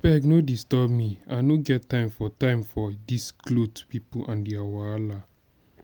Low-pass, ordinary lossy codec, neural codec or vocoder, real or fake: none; none; none; real